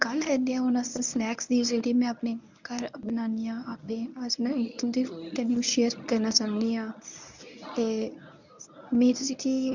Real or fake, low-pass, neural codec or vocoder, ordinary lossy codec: fake; 7.2 kHz; codec, 24 kHz, 0.9 kbps, WavTokenizer, medium speech release version 1; none